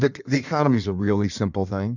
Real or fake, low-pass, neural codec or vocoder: fake; 7.2 kHz; codec, 16 kHz in and 24 kHz out, 1.1 kbps, FireRedTTS-2 codec